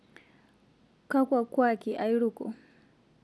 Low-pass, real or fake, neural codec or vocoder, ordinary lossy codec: none; real; none; none